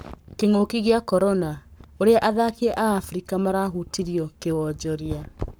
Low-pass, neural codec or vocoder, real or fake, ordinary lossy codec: none; codec, 44.1 kHz, 7.8 kbps, Pupu-Codec; fake; none